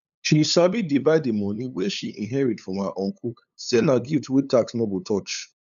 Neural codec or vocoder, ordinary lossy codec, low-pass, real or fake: codec, 16 kHz, 8 kbps, FunCodec, trained on LibriTTS, 25 frames a second; none; 7.2 kHz; fake